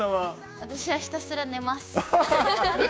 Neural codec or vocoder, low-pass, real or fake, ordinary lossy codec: codec, 16 kHz, 6 kbps, DAC; none; fake; none